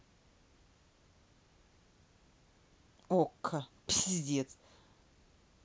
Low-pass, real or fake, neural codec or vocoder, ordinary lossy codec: none; real; none; none